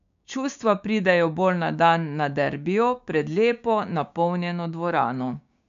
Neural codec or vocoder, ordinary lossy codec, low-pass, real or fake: codec, 16 kHz, 6 kbps, DAC; MP3, 64 kbps; 7.2 kHz; fake